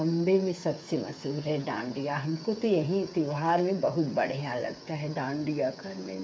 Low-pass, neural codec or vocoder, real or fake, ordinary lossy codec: none; codec, 16 kHz, 8 kbps, FreqCodec, smaller model; fake; none